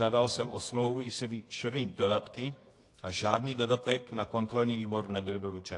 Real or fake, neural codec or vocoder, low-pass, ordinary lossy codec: fake; codec, 24 kHz, 0.9 kbps, WavTokenizer, medium music audio release; 10.8 kHz; AAC, 48 kbps